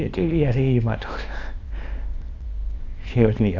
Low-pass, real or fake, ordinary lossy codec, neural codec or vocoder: 7.2 kHz; fake; Opus, 64 kbps; codec, 24 kHz, 0.9 kbps, WavTokenizer, small release